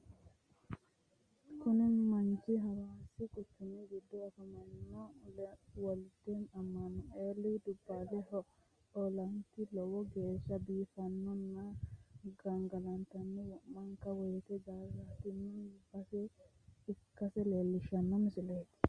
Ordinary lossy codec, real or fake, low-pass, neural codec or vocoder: Opus, 32 kbps; real; 9.9 kHz; none